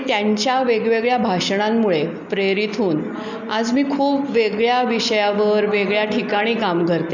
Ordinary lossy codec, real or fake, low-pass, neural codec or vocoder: none; real; 7.2 kHz; none